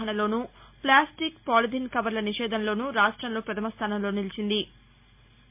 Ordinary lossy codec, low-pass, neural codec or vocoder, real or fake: MP3, 32 kbps; 3.6 kHz; none; real